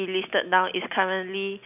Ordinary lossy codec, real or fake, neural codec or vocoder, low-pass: none; real; none; 3.6 kHz